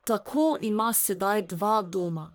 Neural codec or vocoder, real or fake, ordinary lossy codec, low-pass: codec, 44.1 kHz, 1.7 kbps, Pupu-Codec; fake; none; none